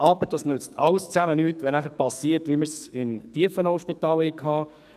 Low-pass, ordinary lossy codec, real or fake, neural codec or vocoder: 14.4 kHz; none; fake; codec, 44.1 kHz, 2.6 kbps, SNAC